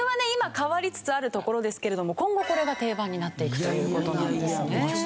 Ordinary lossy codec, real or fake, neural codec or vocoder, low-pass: none; real; none; none